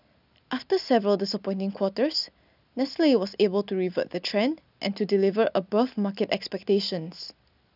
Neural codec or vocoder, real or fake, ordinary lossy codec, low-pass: none; real; none; 5.4 kHz